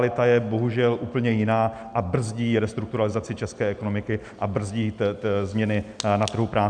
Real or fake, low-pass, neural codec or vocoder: real; 9.9 kHz; none